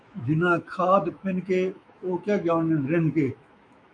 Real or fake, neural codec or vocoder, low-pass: fake; autoencoder, 48 kHz, 128 numbers a frame, DAC-VAE, trained on Japanese speech; 9.9 kHz